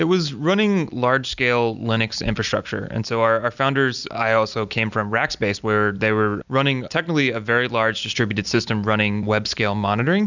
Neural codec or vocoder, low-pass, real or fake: none; 7.2 kHz; real